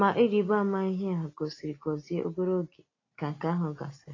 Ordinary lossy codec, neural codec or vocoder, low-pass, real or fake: AAC, 32 kbps; none; 7.2 kHz; real